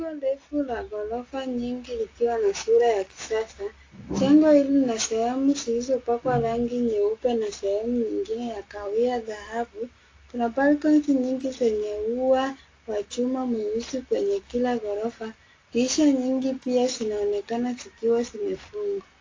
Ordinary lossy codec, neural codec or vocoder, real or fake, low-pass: AAC, 32 kbps; none; real; 7.2 kHz